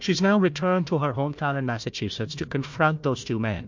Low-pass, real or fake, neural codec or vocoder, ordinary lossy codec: 7.2 kHz; fake; codec, 16 kHz, 1 kbps, FunCodec, trained on Chinese and English, 50 frames a second; MP3, 48 kbps